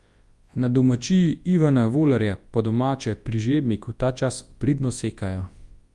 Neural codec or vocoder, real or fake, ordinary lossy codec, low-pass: codec, 24 kHz, 0.9 kbps, WavTokenizer, large speech release; fake; Opus, 32 kbps; 10.8 kHz